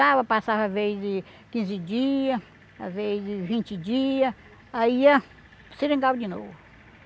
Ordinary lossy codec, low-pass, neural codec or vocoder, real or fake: none; none; none; real